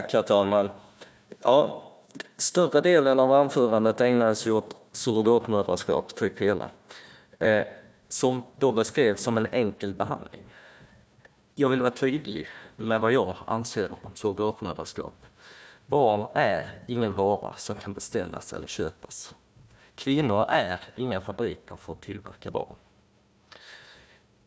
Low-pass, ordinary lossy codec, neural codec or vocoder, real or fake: none; none; codec, 16 kHz, 1 kbps, FunCodec, trained on Chinese and English, 50 frames a second; fake